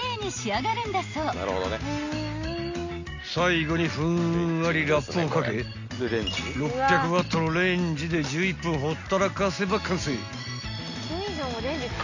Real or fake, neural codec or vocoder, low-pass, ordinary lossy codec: real; none; 7.2 kHz; none